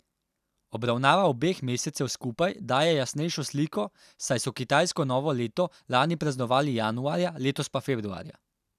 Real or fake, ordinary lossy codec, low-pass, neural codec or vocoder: real; none; 14.4 kHz; none